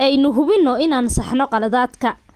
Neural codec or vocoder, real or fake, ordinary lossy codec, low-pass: none; real; Opus, 24 kbps; 14.4 kHz